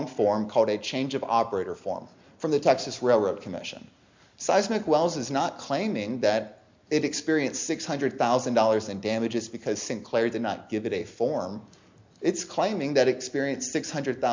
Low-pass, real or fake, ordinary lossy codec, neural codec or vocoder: 7.2 kHz; real; MP3, 64 kbps; none